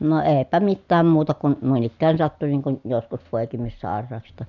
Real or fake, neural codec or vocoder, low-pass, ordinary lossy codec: real; none; 7.2 kHz; none